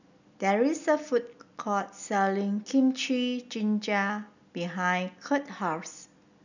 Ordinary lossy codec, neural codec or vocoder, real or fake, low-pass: none; none; real; 7.2 kHz